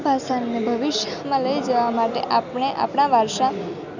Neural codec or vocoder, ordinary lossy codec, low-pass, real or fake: none; none; 7.2 kHz; real